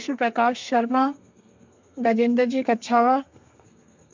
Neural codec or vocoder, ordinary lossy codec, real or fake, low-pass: codec, 32 kHz, 1.9 kbps, SNAC; MP3, 64 kbps; fake; 7.2 kHz